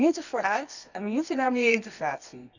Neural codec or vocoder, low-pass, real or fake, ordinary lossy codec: codec, 24 kHz, 0.9 kbps, WavTokenizer, medium music audio release; 7.2 kHz; fake; none